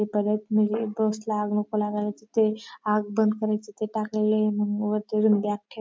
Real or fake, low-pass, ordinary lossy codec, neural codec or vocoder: real; none; none; none